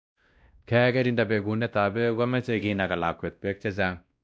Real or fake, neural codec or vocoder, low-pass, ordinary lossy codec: fake; codec, 16 kHz, 1 kbps, X-Codec, WavLM features, trained on Multilingual LibriSpeech; none; none